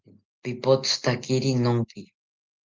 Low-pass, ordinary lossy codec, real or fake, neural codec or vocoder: 7.2 kHz; Opus, 24 kbps; real; none